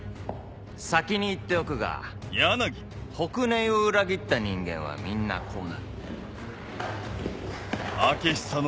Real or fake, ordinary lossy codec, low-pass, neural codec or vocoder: real; none; none; none